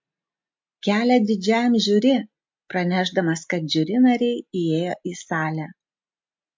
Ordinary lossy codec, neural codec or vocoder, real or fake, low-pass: MP3, 48 kbps; none; real; 7.2 kHz